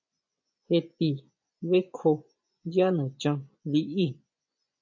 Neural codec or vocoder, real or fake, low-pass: vocoder, 24 kHz, 100 mel bands, Vocos; fake; 7.2 kHz